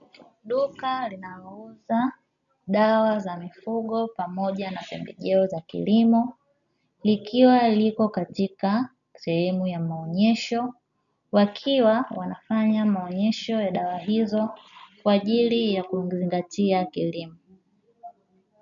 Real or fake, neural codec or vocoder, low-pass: real; none; 7.2 kHz